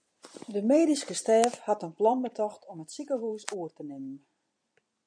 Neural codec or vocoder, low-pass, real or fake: vocoder, 44.1 kHz, 128 mel bands every 256 samples, BigVGAN v2; 9.9 kHz; fake